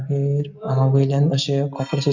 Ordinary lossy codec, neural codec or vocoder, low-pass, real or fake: none; none; 7.2 kHz; real